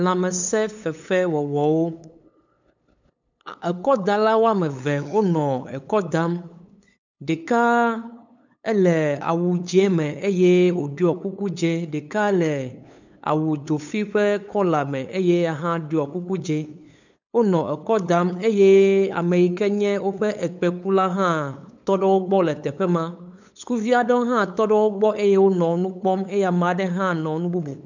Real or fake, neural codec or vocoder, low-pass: fake; codec, 16 kHz, 8 kbps, FunCodec, trained on LibriTTS, 25 frames a second; 7.2 kHz